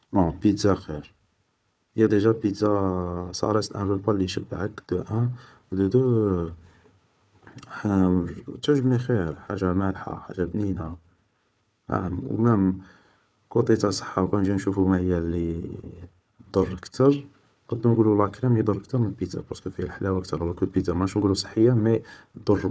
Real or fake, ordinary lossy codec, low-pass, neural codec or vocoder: fake; none; none; codec, 16 kHz, 4 kbps, FunCodec, trained on Chinese and English, 50 frames a second